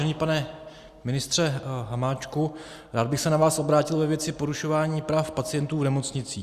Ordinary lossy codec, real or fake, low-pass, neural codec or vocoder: Opus, 64 kbps; real; 14.4 kHz; none